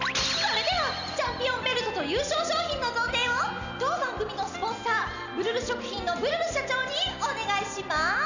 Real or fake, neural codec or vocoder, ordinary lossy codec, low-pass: real; none; none; 7.2 kHz